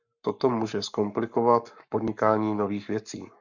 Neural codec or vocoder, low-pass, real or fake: codec, 44.1 kHz, 7.8 kbps, Pupu-Codec; 7.2 kHz; fake